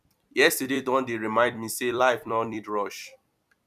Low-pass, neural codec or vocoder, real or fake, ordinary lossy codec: 14.4 kHz; vocoder, 44.1 kHz, 128 mel bands every 256 samples, BigVGAN v2; fake; none